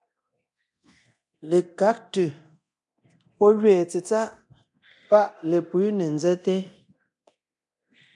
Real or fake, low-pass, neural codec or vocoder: fake; 10.8 kHz; codec, 24 kHz, 0.9 kbps, DualCodec